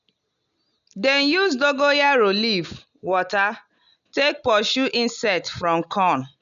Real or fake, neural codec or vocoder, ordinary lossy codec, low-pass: real; none; none; 7.2 kHz